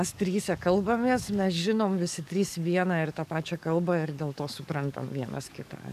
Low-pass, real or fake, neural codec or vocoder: 14.4 kHz; fake; codec, 44.1 kHz, 7.8 kbps, DAC